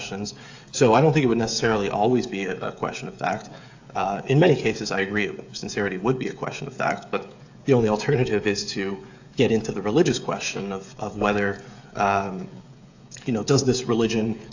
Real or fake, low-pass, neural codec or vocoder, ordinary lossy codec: fake; 7.2 kHz; codec, 16 kHz, 16 kbps, FreqCodec, smaller model; AAC, 48 kbps